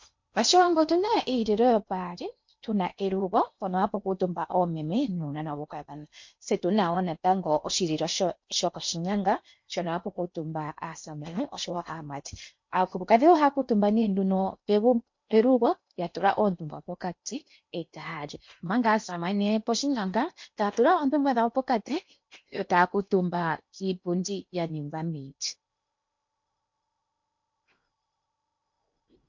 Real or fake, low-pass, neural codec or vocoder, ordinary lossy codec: fake; 7.2 kHz; codec, 16 kHz in and 24 kHz out, 0.8 kbps, FocalCodec, streaming, 65536 codes; MP3, 48 kbps